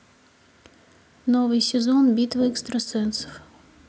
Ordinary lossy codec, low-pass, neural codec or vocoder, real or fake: none; none; none; real